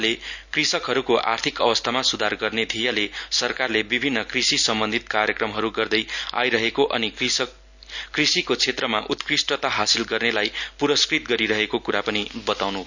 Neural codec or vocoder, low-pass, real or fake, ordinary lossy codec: none; 7.2 kHz; real; none